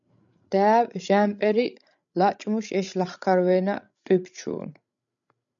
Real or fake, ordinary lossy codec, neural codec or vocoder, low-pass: fake; MP3, 96 kbps; codec, 16 kHz, 16 kbps, FreqCodec, larger model; 7.2 kHz